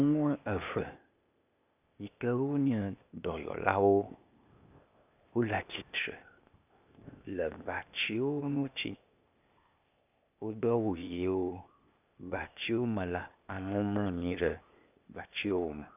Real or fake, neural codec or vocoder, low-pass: fake; codec, 16 kHz, 0.8 kbps, ZipCodec; 3.6 kHz